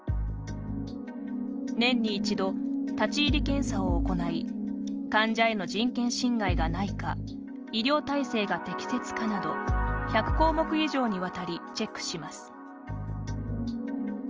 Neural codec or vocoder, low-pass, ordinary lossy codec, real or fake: none; 7.2 kHz; Opus, 24 kbps; real